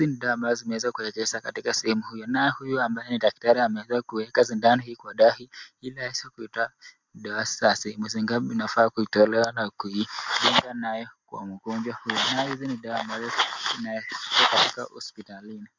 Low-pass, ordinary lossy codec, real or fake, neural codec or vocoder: 7.2 kHz; MP3, 64 kbps; real; none